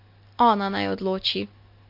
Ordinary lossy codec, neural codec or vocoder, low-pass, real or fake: MP3, 32 kbps; none; 5.4 kHz; real